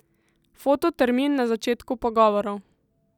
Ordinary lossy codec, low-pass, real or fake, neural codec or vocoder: none; 19.8 kHz; real; none